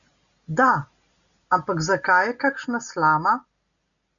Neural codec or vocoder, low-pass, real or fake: none; 7.2 kHz; real